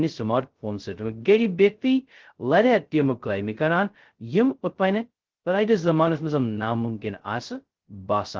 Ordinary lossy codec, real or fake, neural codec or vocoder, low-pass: Opus, 16 kbps; fake; codec, 16 kHz, 0.2 kbps, FocalCodec; 7.2 kHz